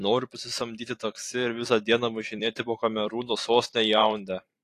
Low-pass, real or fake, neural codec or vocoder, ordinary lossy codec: 14.4 kHz; fake; vocoder, 44.1 kHz, 128 mel bands every 256 samples, BigVGAN v2; AAC, 48 kbps